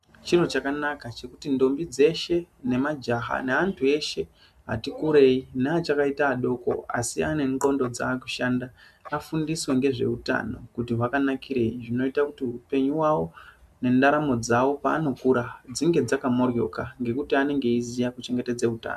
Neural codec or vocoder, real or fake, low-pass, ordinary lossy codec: none; real; 14.4 kHz; MP3, 96 kbps